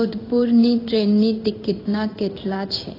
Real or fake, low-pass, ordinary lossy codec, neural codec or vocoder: fake; 5.4 kHz; AAC, 24 kbps; codec, 16 kHz in and 24 kHz out, 1 kbps, XY-Tokenizer